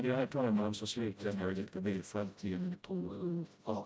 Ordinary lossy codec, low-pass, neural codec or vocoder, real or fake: none; none; codec, 16 kHz, 0.5 kbps, FreqCodec, smaller model; fake